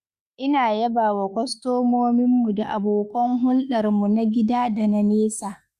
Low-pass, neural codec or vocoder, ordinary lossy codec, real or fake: 14.4 kHz; autoencoder, 48 kHz, 32 numbers a frame, DAC-VAE, trained on Japanese speech; Opus, 64 kbps; fake